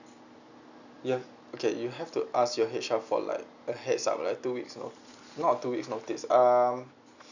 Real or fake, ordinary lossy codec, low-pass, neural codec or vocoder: real; none; 7.2 kHz; none